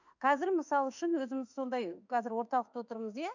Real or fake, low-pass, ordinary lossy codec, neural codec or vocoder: fake; 7.2 kHz; none; autoencoder, 48 kHz, 32 numbers a frame, DAC-VAE, trained on Japanese speech